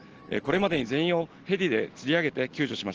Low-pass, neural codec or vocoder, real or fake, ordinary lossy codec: 7.2 kHz; none; real; Opus, 16 kbps